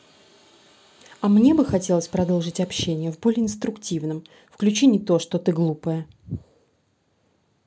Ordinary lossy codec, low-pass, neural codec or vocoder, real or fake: none; none; none; real